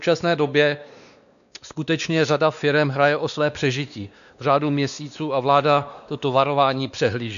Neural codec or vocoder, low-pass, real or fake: codec, 16 kHz, 2 kbps, X-Codec, WavLM features, trained on Multilingual LibriSpeech; 7.2 kHz; fake